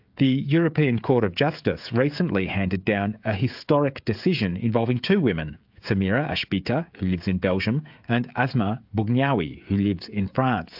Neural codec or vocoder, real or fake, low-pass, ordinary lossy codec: codec, 16 kHz, 16 kbps, FreqCodec, smaller model; fake; 5.4 kHz; AAC, 48 kbps